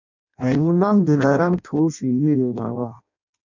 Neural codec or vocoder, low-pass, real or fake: codec, 16 kHz in and 24 kHz out, 0.6 kbps, FireRedTTS-2 codec; 7.2 kHz; fake